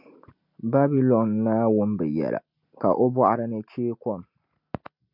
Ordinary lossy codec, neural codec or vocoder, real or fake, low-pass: MP3, 48 kbps; none; real; 5.4 kHz